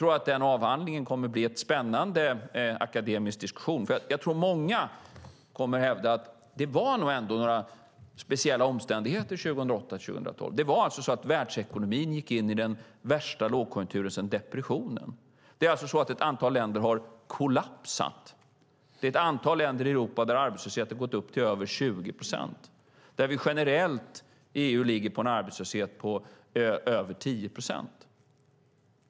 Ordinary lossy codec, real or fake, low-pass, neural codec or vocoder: none; real; none; none